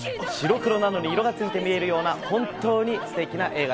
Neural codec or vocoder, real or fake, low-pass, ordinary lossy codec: none; real; none; none